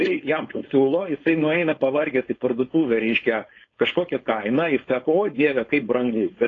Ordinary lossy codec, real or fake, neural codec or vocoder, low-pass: AAC, 32 kbps; fake; codec, 16 kHz, 4.8 kbps, FACodec; 7.2 kHz